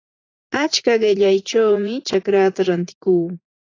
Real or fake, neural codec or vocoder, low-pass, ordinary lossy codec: fake; vocoder, 44.1 kHz, 80 mel bands, Vocos; 7.2 kHz; AAC, 32 kbps